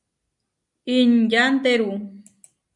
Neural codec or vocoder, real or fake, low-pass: none; real; 10.8 kHz